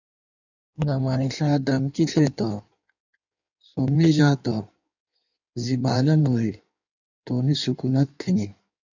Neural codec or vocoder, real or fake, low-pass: codec, 16 kHz in and 24 kHz out, 1.1 kbps, FireRedTTS-2 codec; fake; 7.2 kHz